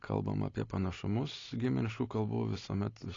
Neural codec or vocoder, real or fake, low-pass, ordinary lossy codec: none; real; 7.2 kHz; AAC, 32 kbps